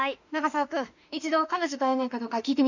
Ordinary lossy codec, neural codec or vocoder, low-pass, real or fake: none; autoencoder, 48 kHz, 32 numbers a frame, DAC-VAE, trained on Japanese speech; 7.2 kHz; fake